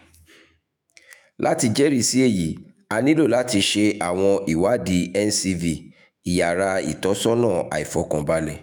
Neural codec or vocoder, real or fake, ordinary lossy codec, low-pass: autoencoder, 48 kHz, 128 numbers a frame, DAC-VAE, trained on Japanese speech; fake; none; none